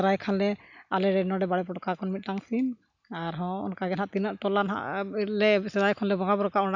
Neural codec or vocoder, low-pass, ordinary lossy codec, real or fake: none; 7.2 kHz; none; real